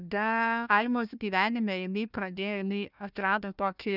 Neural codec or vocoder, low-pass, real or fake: codec, 16 kHz, 1 kbps, FunCodec, trained on Chinese and English, 50 frames a second; 5.4 kHz; fake